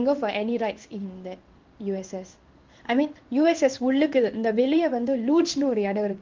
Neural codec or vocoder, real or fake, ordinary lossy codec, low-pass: codec, 16 kHz in and 24 kHz out, 1 kbps, XY-Tokenizer; fake; Opus, 32 kbps; 7.2 kHz